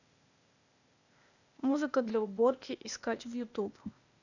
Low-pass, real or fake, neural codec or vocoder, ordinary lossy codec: 7.2 kHz; fake; codec, 16 kHz, 0.8 kbps, ZipCodec; none